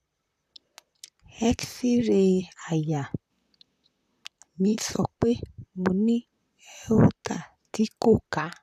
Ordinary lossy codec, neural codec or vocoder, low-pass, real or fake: none; vocoder, 44.1 kHz, 128 mel bands, Pupu-Vocoder; 14.4 kHz; fake